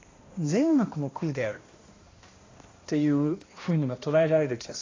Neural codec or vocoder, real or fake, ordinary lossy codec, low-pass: codec, 16 kHz, 1 kbps, X-Codec, HuBERT features, trained on balanced general audio; fake; AAC, 32 kbps; 7.2 kHz